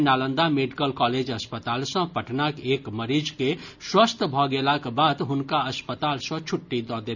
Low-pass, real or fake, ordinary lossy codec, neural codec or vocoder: 7.2 kHz; real; none; none